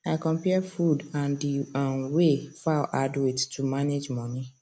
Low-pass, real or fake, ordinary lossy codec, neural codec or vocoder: none; real; none; none